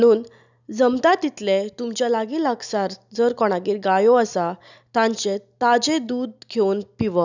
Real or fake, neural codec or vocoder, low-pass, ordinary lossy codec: real; none; 7.2 kHz; none